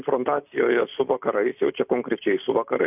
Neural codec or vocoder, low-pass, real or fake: none; 3.6 kHz; real